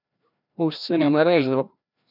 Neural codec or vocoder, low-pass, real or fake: codec, 16 kHz, 1 kbps, FreqCodec, larger model; 5.4 kHz; fake